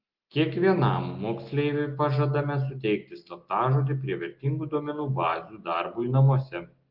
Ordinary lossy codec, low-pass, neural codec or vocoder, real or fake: Opus, 32 kbps; 5.4 kHz; none; real